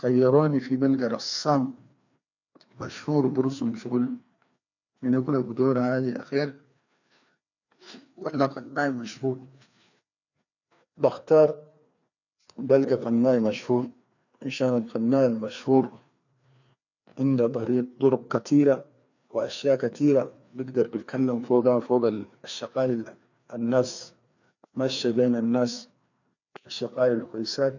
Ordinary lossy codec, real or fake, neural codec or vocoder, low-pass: none; fake; codec, 16 kHz, 2 kbps, FreqCodec, larger model; 7.2 kHz